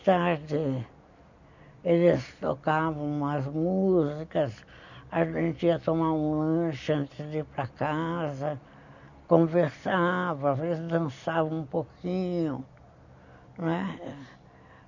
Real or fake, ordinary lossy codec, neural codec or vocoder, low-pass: real; none; none; 7.2 kHz